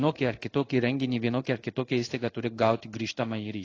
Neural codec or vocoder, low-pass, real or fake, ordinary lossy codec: codec, 16 kHz in and 24 kHz out, 1 kbps, XY-Tokenizer; 7.2 kHz; fake; AAC, 32 kbps